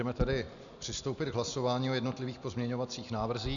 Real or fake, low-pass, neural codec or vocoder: real; 7.2 kHz; none